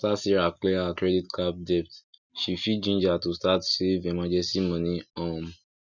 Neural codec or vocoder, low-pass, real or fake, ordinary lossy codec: none; 7.2 kHz; real; none